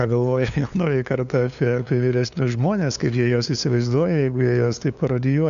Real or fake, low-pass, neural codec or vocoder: fake; 7.2 kHz; codec, 16 kHz, 2 kbps, FunCodec, trained on LibriTTS, 25 frames a second